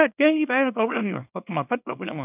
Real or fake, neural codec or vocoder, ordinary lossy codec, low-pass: fake; codec, 24 kHz, 0.9 kbps, WavTokenizer, small release; none; 3.6 kHz